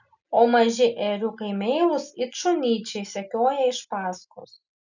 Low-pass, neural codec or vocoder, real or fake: 7.2 kHz; none; real